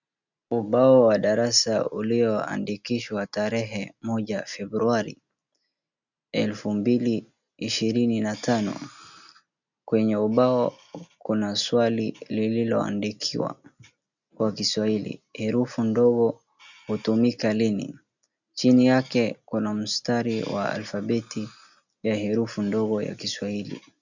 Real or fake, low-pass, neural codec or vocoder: real; 7.2 kHz; none